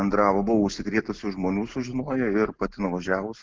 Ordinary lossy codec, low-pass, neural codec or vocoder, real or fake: Opus, 16 kbps; 7.2 kHz; none; real